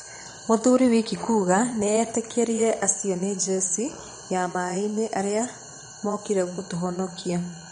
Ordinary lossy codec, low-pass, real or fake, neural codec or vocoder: MP3, 48 kbps; 9.9 kHz; fake; vocoder, 22.05 kHz, 80 mel bands, Vocos